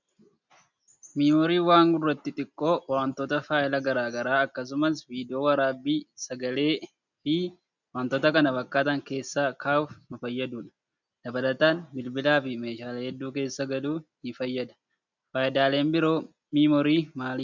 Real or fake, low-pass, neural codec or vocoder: real; 7.2 kHz; none